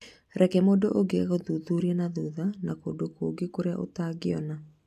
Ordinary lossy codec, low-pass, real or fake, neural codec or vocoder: AAC, 96 kbps; 14.4 kHz; real; none